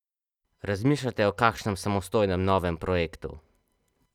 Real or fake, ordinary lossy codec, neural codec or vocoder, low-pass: real; none; none; 19.8 kHz